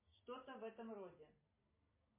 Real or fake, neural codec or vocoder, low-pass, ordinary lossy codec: real; none; 3.6 kHz; MP3, 16 kbps